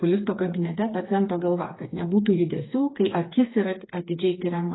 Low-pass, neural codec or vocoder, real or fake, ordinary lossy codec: 7.2 kHz; codec, 16 kHz, 4 kbps, FreqCodec, smaller model; fake; AAC, 16 kbps